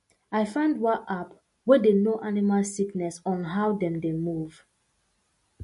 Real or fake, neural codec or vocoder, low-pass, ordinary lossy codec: real; none; 14.4 kHz; MP3, 48 kbps